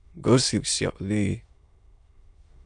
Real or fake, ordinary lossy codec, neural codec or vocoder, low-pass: fake; MP3, 96 kbps; autoencoder, 22.05 kHz, a latent of 192 numbers a frame, VITS, trained on many speakers; 9.9 kHz